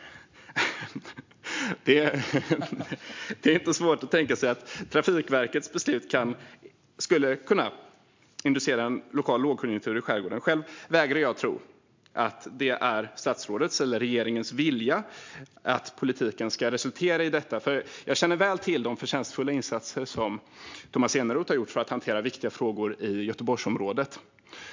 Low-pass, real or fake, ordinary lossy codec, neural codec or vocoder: 7.2 kHz; real; MP3, 64 kbps; none